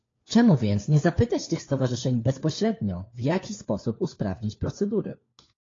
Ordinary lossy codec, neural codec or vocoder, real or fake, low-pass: AAC, 32 kbps; codec, 16 kHz, 4 kbps, FunCodec, trained on LibriTTS, 50 frames a second; fake; 7.2 kHz